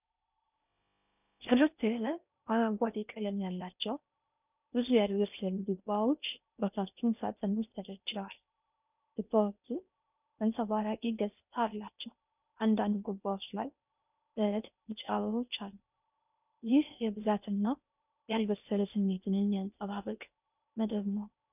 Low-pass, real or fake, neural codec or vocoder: 3.6 kHz; fake; codec, 16 kHz in and 24 kHz out, 0.6 kbps, FocalCodec, streaming, 4096 codes